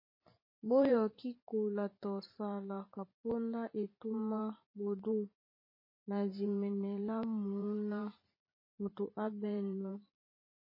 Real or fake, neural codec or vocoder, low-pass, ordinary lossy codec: fake; vocoder, 44.1 kHz, 128 mel bands every 512 samples, BigVGAN v2; 5.4 kHz; MP3, 24 kbps